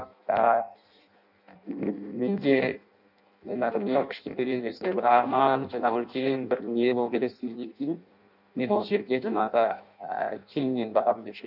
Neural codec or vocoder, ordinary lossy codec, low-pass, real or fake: codec, 16 kHz in and 24 kHz out, 0.6 kbps, FireRedTTS-2 codec; none; 5.4 kHz; fake